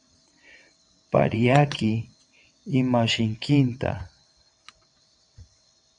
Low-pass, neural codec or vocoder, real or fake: 9.9 kHz; vocoder, 22.05 kHz, 80 mel bands, WaveNeXt; fake